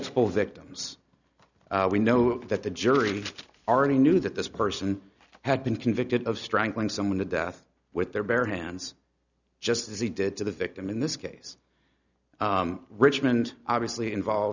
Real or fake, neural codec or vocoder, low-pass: real; none; 7.2 kHz